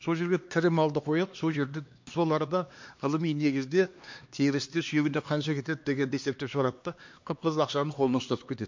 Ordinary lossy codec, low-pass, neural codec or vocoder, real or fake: MP3, 48 kbps; 7.2 kHz; codec, 16 kHz, 2 kbps, X-Codec, HuBERT features, trained on LibriSpeech; fake